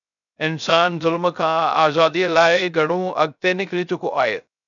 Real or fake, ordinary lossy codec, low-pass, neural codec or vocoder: fake; MP3, 96 kbps; 7.2 kHz; codec, 16 kHz, 0.3 kbps, FocalCodec